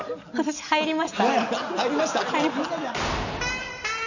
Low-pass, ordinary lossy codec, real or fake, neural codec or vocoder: 7.2 kHz; none; real; none